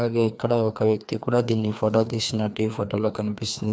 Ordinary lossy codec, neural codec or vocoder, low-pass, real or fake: none; codec, 16 kHz, 2 kbps, FreqCodec, larger model; none; fake